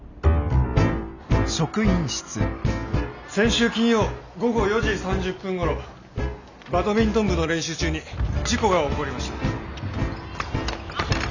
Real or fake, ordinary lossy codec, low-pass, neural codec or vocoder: real; none; 7.2 kHz; none